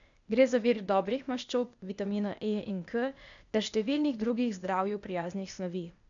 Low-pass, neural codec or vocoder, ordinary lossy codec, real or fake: 7.2 kHz; codec, 16 kHz, 0.8 kbps, ZipCodec; none; fake